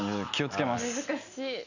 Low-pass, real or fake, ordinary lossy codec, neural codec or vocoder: 7.2 kHz; real; none; none